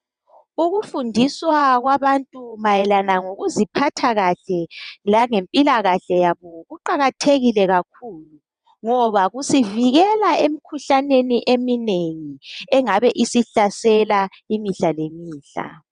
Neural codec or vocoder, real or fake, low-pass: vocoder, 22.05 kHz, 80 mel bands, WaveNeXt; fake; 9.9 kHz